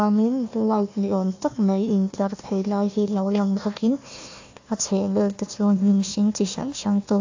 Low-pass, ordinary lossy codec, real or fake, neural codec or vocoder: 7.2 kHz; AAC, 48 kbps; fake; codec, 16 kHz, 1 kbps, FunCodec, trained on Chinese and English, 50 frames a second